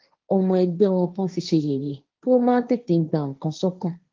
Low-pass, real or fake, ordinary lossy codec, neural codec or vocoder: 7.2 kHz; fake; Opus, 32 kbps; codec, 16 kHz, 1.1 kbps, Voila-Tokenizer